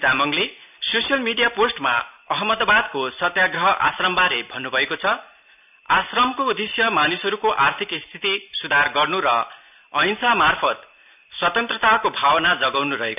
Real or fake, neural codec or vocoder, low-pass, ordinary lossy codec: real; none; 3.6 kHz; none